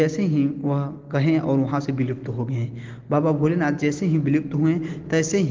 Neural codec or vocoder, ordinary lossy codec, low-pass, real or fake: none; Opus, 16 kbps; 7.2 kHz; real